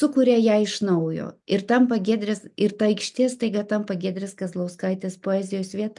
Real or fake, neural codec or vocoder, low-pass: real; none; 10.8 kHz